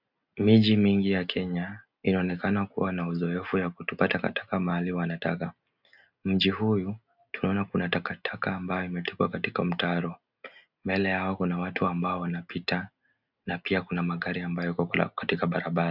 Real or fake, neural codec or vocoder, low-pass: real; none; 5.4 kHz